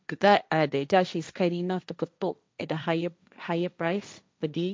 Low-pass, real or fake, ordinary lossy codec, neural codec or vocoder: none; fake; none; codec, 16 kHz, 1.1 kbps, Voila-Tokenizer